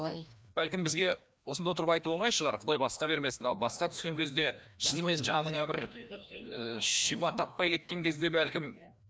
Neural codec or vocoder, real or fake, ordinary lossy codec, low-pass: codec, 16 kHz, 1 kbps, FreqCodec, larger model; fake; none; none